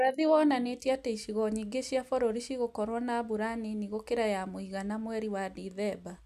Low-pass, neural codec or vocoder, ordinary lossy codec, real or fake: 14.4 kHz; none; none; real